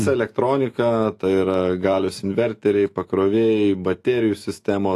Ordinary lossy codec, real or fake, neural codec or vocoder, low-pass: AAC, 48 kbps; fake; autoencoder, 48 kHz, 128 numbers a frame, DAC-VAE, trained on Japanese speech; 14.4 kHz